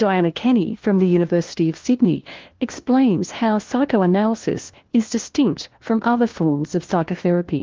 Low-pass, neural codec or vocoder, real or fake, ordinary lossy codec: 7.2 kHz; codec, 16 kHz, 1 kbps, FunCodec, trained on LibriTTS, 50 frames a second; fake; Opus, 16 kbps